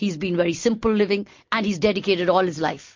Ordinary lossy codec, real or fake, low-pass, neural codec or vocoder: MP3, 48 kbps; real; 7.2 kHz; none